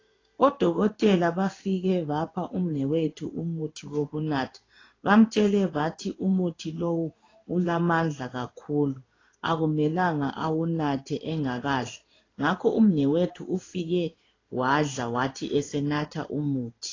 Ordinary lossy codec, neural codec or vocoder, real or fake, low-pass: AAC, 32 kbps; codec, 16 kHz in and 24 kHz out, 1 kbps, XY-Tokenizer; fake; 7.2 kHz